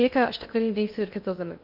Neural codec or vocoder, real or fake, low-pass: codec, 16 kHz in and 24 kHz out, 0.6 kbps, FocalCodec, streaming, 2048 codes; fake; 5.4 kHz